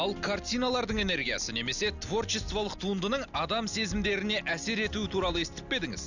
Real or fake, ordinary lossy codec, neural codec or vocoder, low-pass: real; none; none; 7.2 kHz